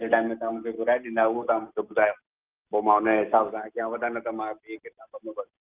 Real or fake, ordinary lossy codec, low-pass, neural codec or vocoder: real; Opus, 24 kbps; 3.6 kHz; none